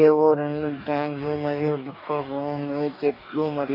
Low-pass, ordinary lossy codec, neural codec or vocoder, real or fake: 5.4 kHz; none; codec, 44.1 kHz, 2.6 kbps, DAC; fake